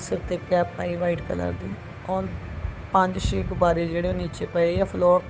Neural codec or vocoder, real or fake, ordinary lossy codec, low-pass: codec, 16 kHz, 8 kbps, FunCodec, trained on Chinese and English, 25 frames a second; fake; none; none